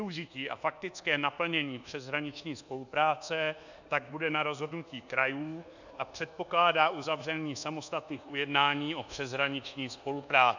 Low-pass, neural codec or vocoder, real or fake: 7.2 kHz; codec, 24 kHz, 1.2 kbps, DualCodec; fake